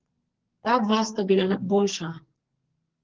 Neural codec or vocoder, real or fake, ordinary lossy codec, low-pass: codec, 44.1 kHz, 2.6 kbps, SNAC; fake; Opus, 16 kbps; 7.2 kHz